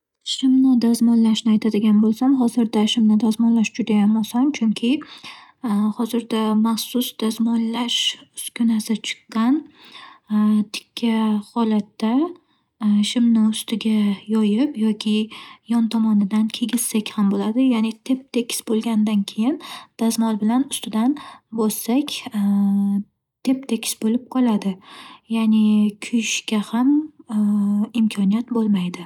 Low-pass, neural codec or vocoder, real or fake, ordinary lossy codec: 19.8 kHz; none; real; none